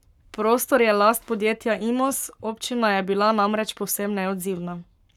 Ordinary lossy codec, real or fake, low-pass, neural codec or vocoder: none; fake; 19.8 kHz; codec, 44.1 kHz, 7.8 kbps, Pupu-Codec